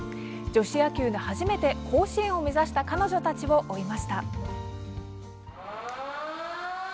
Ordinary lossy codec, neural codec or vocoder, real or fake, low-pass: none; none; real; none